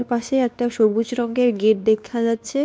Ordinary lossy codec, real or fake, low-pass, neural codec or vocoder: none; fake; none; codec, 16 kHz, 2 kbps, X-Codec, WavLM features, trained on Multilingual LibriSpeech